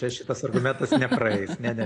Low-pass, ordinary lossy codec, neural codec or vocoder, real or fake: 9.9 kHz; AAC, 48 kbps; none; real